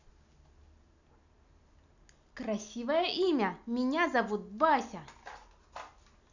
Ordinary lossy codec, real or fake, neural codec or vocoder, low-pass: none; real; none; 7.2 kHz